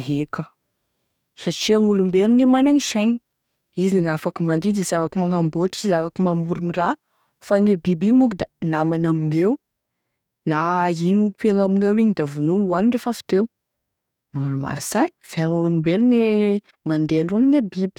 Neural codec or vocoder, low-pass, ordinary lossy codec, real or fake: codec, 44.1 kHz, 2.6 kbps, DAC; 19.8 kHz; none; fake